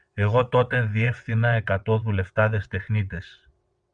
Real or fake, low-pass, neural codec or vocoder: fake; 9.9 kHz; vocoder, 22.05 kHz, 80 mel bands, WaveNeXt